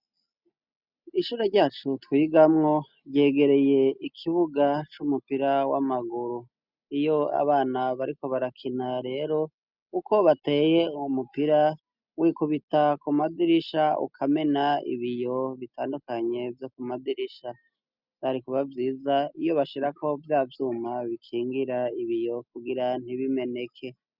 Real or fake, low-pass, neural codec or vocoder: real; 5.4 kHz; none